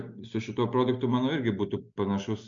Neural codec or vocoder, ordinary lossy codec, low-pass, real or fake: none; MP3, 64 kbps; 7.2 kHz; real